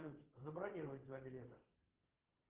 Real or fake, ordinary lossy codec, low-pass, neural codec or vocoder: fake; Opus, 16 kbps; 3.6 kHz; vocoder, 22.05 kHz, 80 mel bands, WaveNeXt